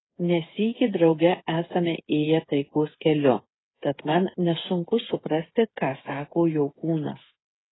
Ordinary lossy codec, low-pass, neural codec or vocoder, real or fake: AAC, 16 kbps; 7.2 kHz; codec, 16 kHz, 8 kbps, FreqCodec, smaller model; fake